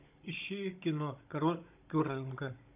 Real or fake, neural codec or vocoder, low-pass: fake; codec, 16 kHz, 16 kbps, FunCodec, trained on Chinese and English, 50 frames a second; 3.6 kHz